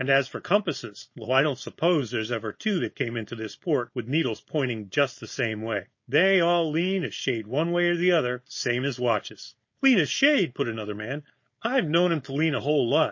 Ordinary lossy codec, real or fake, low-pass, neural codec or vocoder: MP3, 32 kbps; fake; 7.2 kHz; codec, 16 kHz, 4.8 kbps, FACodec